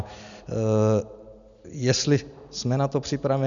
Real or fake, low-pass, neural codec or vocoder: real; 7.2 kHz; none